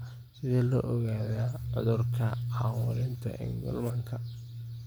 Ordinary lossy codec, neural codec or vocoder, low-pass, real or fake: none; none; none; real